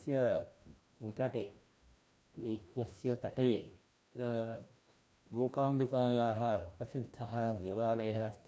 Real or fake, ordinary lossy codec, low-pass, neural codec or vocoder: fake; none; none; codec, 16 kHz, 1 kbps, FreqCodec, larger model